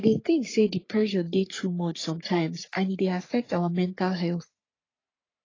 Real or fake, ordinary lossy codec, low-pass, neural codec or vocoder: fake; AAC, 32 kbps; 7.2 kHz; codec, 44.1 kHz, 3.4 kbps, Pupu-Codec